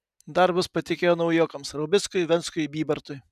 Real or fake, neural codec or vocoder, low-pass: real; none; 14.4 kHz